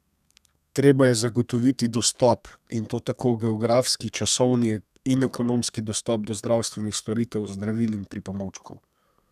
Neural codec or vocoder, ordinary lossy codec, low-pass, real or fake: codec, 32 kHz, 1.9 kbps, SNAC; none; 14.4 kHz; fake